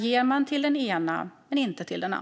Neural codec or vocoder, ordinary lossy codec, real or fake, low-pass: none; none; real; none